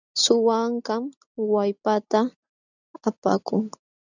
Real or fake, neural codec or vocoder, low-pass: real; none; 7.2 kHz